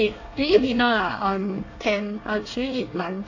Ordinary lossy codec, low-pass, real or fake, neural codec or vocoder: AAC, 48 kbps; 7.2 kHz; fake; codec, 24 kHz, 1 kbps, SNAC